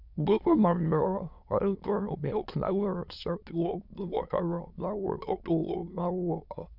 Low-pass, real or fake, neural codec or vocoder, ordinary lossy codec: 5.4 kHz; fake; autoencoder, 22.05 kHz, a latent of 192 numbers a frame, VITS, trained on many speakers; none